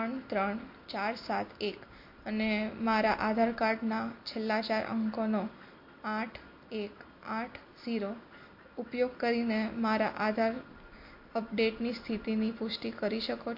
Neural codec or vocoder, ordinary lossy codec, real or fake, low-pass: none; MP3, 32 kbps; real; 5.4 kHz